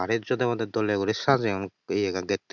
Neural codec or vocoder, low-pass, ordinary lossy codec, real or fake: none; 7.2 kHz; none; real